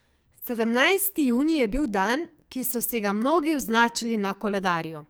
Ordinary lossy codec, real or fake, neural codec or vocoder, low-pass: none; fake; codec, 44.1 kHz, 2.6 kbps, SNAC; none